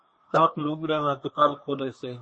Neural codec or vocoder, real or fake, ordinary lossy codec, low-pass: codec, 24 kHz, 1 kbps, SNAC; fake; MP3, 32 kbps; 9.9 kHz